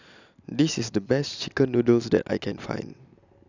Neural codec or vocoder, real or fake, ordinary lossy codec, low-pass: none; real; none; 7.2 kHz